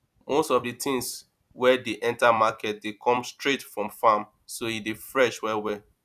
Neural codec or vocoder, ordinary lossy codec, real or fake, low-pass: vocoder, 44.1 kHz, 128 mel bands every 256 samples, BigVGAN v2; none; fake; 14.4 kHz